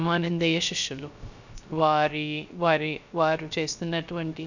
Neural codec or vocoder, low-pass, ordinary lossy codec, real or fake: codec, 16 kHz, 0.3 kbps, FocalCodec; 7.2 kHz; none; fake